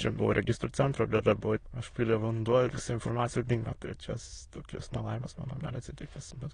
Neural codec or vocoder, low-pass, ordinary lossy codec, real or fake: autoencoder, 22.05 kHz, a latent of 192 numbers a frame, VITS, trained on many speakers; 9.9 kHz; AAC, 32 kbps; fake